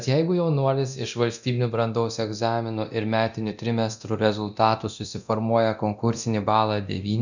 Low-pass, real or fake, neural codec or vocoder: 7.2 kHz; fake; codec, 24 kHz, 0.9 kbps, DualCodec